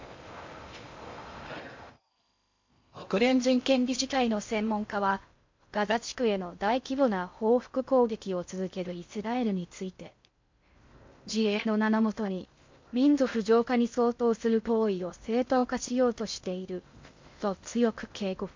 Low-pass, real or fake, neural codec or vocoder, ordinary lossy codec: 7.2 kHz; fake; codec, 16 kHz in and 24 kHz out, 0.6 kbps, FocalCodec, streaming, 2048 codes; MP3, 48 kbps